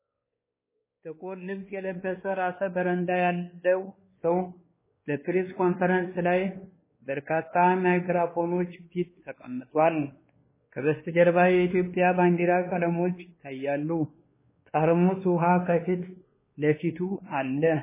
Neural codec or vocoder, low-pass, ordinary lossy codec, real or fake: codec, 16 kHz, 2 kbps, X-Codec, WavLM features, trained on Multilingual LibriSpeech; 3.6 kHz; MP3, 16 kbps; fake